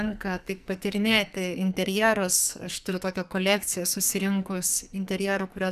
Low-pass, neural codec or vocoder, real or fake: 14.4 kHz; codec, 44.1 kHz, 2.6 kbps, SNAC; fake